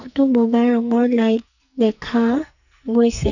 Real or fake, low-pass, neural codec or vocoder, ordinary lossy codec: fake; 7.2 kHz; codec, 44.1 kHz, 2.6 kbps, SNAC; none